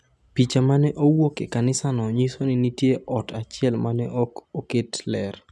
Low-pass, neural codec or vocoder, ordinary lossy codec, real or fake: none; none; none; real